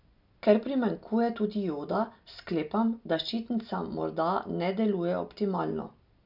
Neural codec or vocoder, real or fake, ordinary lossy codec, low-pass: none; real; none; 5.4 kHz